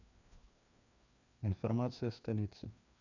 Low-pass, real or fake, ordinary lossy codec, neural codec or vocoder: 7.2 kHz; fake; none; codec, 16 kHz, 2 kbps, FreqCodec, larger model